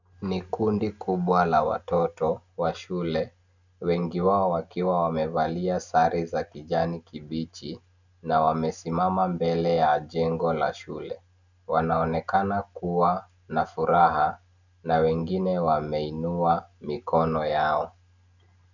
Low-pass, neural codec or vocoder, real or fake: 7.2 kHz; none; real